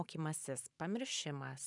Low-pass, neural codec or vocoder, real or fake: 10.8 kHz; vocoder, 44.1 kHz, 128 mel bands every 512 samples, BigVGAN v2; fake